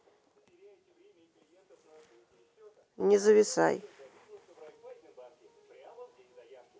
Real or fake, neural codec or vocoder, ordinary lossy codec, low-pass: real; none; none; none